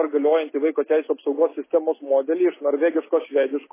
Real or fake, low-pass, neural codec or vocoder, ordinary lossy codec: real; 3.6 kHz; none; MP3, 16 kbps